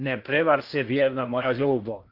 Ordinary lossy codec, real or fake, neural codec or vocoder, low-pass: Opus, 32 kbps; fake; codec, 16 kHz in and 24 kHz out, 0.8 kbps, FocalCodec, streaming, 65536 codes; 5.4 kHz